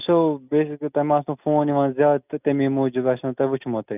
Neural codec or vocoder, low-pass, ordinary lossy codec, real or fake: none; 3.6 kHz; none; real